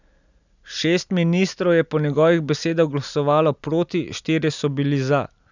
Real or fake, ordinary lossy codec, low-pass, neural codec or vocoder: real; none; 7.2 kHz; none